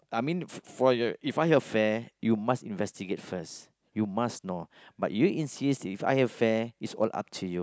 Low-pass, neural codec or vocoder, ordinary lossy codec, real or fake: none; none; none; real